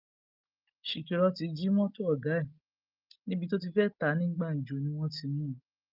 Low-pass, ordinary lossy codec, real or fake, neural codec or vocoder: 5.4 kHz; Opus, 32 kbps; real; none